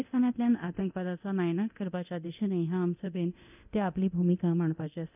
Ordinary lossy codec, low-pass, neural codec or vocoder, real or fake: none; 3.6 kHz; codec, 24 kHz, 0.9 kbps, DualCodec; fake